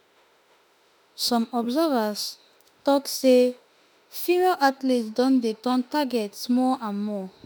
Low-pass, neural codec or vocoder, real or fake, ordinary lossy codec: none; autoencoder, 48 kHz, 32 numbers a frame, DAC-VAE, trained on Japanese speech; fake; none